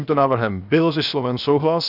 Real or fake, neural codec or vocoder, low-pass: fake; codec, 16 kHz, 0.7 kbps, FocalCodec; 5.4 kHz